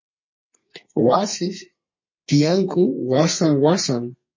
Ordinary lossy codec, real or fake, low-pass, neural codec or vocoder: MP3, 32 kbps; fake; 7.2 kHz; codec, 32 kHz, 1.9 kbps, SNAC